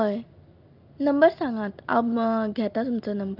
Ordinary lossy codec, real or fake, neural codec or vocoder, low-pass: Opus, 32 kbps; real; none; 5.4 kHz